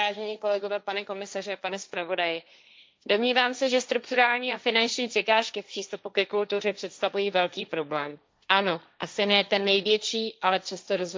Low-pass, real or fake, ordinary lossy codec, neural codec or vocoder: none; fake; none; codec, 16 kHz, 1.1 kbps, Voila-Tokenizer